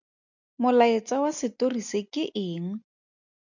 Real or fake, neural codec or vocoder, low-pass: real; none; 7.2 kHz